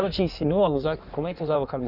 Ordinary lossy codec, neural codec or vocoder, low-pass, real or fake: none; codec, 16 kHz in and 24 kHz out, 1.1 kbps, FireRedTTS-2 codec; 5.4 kHz; fake